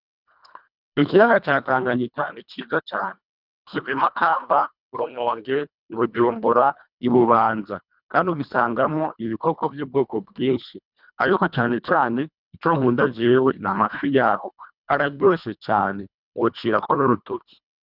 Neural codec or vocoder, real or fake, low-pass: codec, 24 kHz, 1.5 kbps, HILCodec; fake; 5.4 kHz